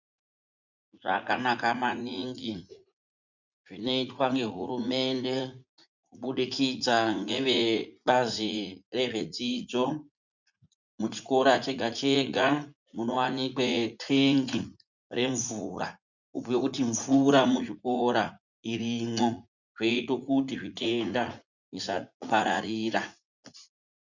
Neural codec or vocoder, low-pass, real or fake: vocoder, 44.1 kHz, 80 mel bands, Vocos; 7.2 kHz; fake